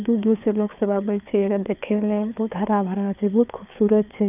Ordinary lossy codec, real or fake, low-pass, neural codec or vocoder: none; fake; 3.6 kHz; codec, 16 kHz, 4 kbps, FreqCodec, larger model